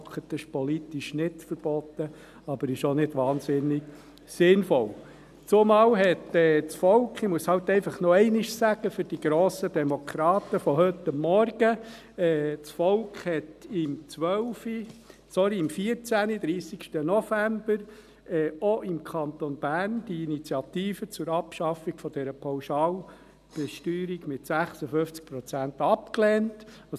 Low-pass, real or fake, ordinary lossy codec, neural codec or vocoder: 14.4 kHz; real; none; none